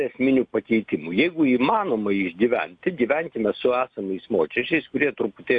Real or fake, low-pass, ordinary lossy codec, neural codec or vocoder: real; 9.9 kHz; AAC, 64 kbps; none